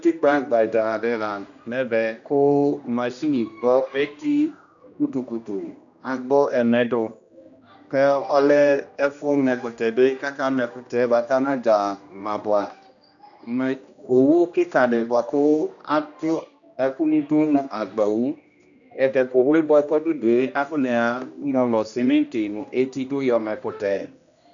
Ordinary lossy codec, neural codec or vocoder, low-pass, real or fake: MP3, 96 kbps; codec, 16 kHz, 1 kbps, X-Codec, HuBERT features, trained on general audio; 7.2 kHz; fake